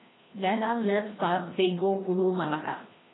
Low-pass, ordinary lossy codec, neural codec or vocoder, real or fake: 7.2 kHz; AAC, 16 kbps; codec, 16 kHz, 1 kbps, FreqCodec, larger model; fake